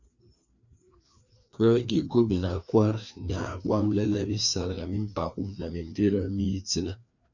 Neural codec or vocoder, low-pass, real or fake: codec, 16 kHz, 2 kbps, FreqCodec, larger model; 7.2 kHz; fake